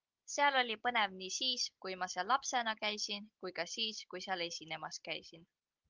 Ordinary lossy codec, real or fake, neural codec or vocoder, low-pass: Opus, 32 kbps; real; none; 7.2 kHz